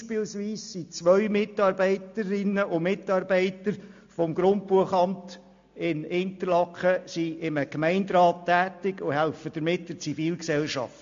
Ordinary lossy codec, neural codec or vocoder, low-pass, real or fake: MP3, 48 kbps; none; 7.2 kHz; real